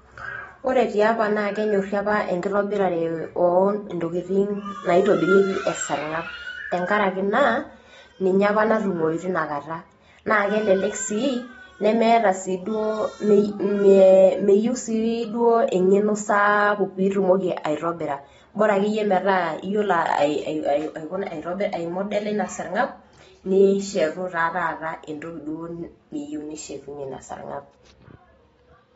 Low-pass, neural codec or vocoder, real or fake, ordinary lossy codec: 10.8 kHz; none; real; AAC, 24 kbps